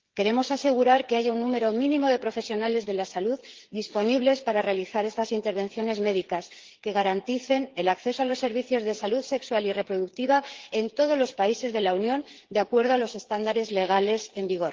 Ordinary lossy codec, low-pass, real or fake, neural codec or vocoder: Opus, 16 kbps; 7.2 kHz; fake; codec, 16 kHz, 8 kbps, FreqCodec, smaller model